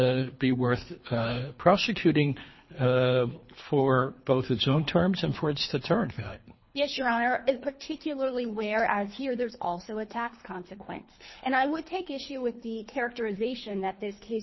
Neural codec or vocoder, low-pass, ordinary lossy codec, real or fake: codec, 24 kHz, 3 kbps, HILCodec; 7.2 kHz; MP3, 24 kbps; fake